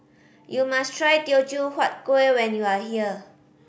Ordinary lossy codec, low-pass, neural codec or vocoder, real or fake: none; none; none; real